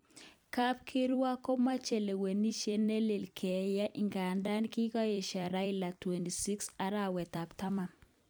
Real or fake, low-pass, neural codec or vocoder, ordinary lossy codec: fake; none; vocoder, 44.1 kHz, 128 mel bands every 256 samples, BigVGAN v2; none